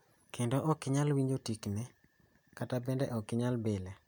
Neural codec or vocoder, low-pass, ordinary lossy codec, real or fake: none; 19.8 kHz; none; real